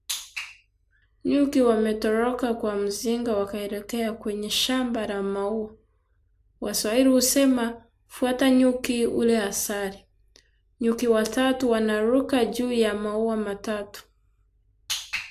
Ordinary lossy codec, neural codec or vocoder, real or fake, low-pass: AAC, 96 kbps; none; real; 14.4 kHz